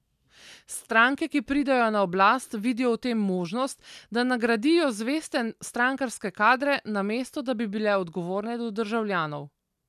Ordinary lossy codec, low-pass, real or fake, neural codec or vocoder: none; 14.4 kHz; real; none